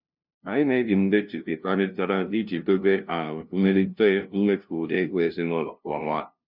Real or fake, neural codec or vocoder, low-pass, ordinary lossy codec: fake; codec, 16 kHz, 0.5 kbps, FunCodec, trained on LibriTTS, 25 frames a second; 5.4 kHz; none